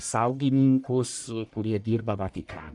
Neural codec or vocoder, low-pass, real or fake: codec, 44.1 kHz, 1.7 kbps, Pupu-Codec; 10.8 kHz; fake